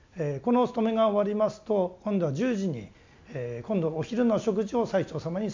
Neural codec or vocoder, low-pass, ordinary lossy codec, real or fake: none; 7.2 kHz; MP3, 64 kbps; real